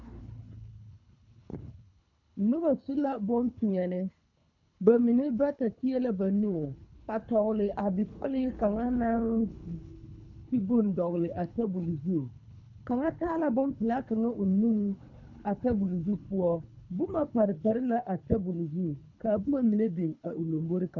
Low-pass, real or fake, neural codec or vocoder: 7.2 kHz; fake; codec, 24 kHz, 3 kbps, HILCodec